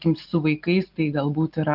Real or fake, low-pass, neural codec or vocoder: real; 5.4 kHz; none